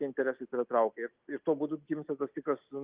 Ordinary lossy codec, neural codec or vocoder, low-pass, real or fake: Opus, 24 kbps; none; 3.6 kHz; real